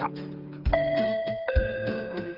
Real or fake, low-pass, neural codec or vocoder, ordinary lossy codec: fake; 5.4 kHz; codec, 16 kHz, 8 kbps, FreqCodec, smaller model; Opus, 24 kbps